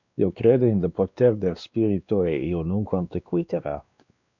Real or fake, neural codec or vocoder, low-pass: fake; codec, 16 kHz, 2 kbps, X-Codec, WavLM features, trained on Multilingual LibriSpeech; 7.2 kHz